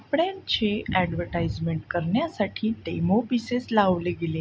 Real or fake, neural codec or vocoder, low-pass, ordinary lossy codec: real; none; none; none